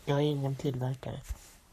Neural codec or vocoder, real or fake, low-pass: codec, 44.1 kHz, 3.4 kbps, Pupu-Codec; fake; 14.4 kHz